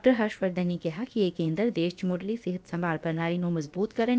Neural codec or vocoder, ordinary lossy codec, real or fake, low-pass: codec, 16 kHz, about 1 kbps, DyCAST, with the encoder's durations; none; fake; none